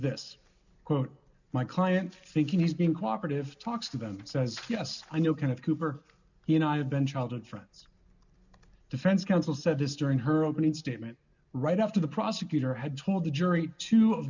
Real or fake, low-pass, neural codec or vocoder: real; 7.2 kHz; none